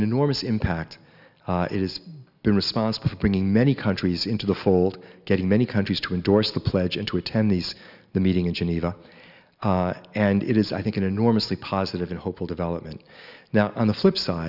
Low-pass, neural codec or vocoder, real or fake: 5.4 kHz; none; real